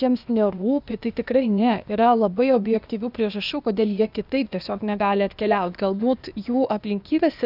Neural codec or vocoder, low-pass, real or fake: codec, 16 kHz, 0.8 kbps, ZipCodec; 5.4 kHz; fake